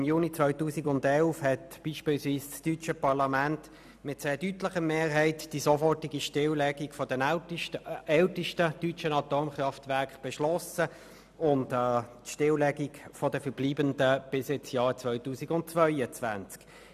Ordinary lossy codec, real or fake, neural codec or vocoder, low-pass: none; real; none; 14.4 kHz